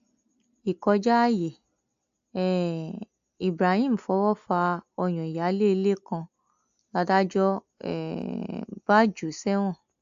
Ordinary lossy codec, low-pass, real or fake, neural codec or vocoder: AAC, 64 kbps; 7.2 kHz; real; none